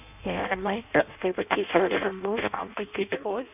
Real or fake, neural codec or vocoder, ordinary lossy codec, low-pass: fake; codec, 16 kHz in and 24 kHz out, 0.6 kbps, FireRedTTS-2 codec; none; 3.6 kHz